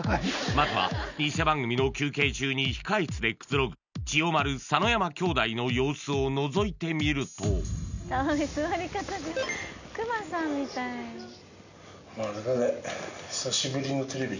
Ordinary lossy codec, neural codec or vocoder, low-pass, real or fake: none; none; 7.2 kHz; real